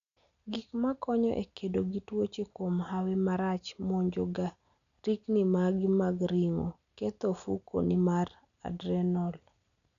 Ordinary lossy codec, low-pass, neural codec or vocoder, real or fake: none; 7.2 kHz; none; real